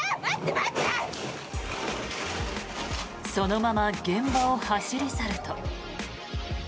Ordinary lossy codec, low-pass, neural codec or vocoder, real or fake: none; none; none; real